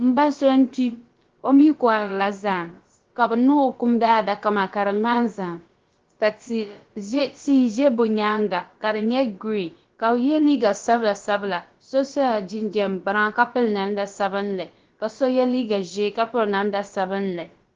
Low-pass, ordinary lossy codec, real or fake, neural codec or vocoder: 7.2 kHz; Opus, 16 kbps; fake; codec, 16 kHz, about 1 kbps, DyCAST, with the encoder's durations